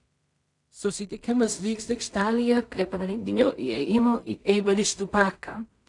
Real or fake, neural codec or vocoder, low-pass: fake; codec, 16 kHz in and 24 kHz out, 0.4 kbps, LongCat-Audio-Codec, two codebook decoder; 10.8 kHz